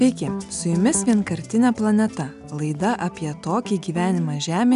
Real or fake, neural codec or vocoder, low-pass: real; none; 10.8 kHz